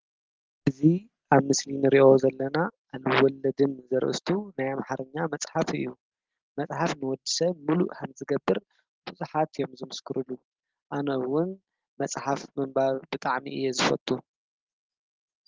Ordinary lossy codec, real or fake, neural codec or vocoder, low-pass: Opus, 16 kbps; real; none; 7.2 kHz